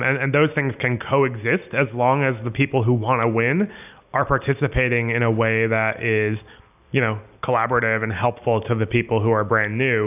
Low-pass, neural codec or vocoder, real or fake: 3.6 kHz; none; real